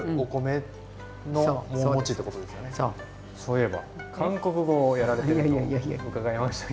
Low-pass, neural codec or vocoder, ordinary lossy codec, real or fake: none; none; none; real